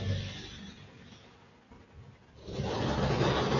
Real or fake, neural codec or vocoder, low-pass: real; none; 7.2 kHz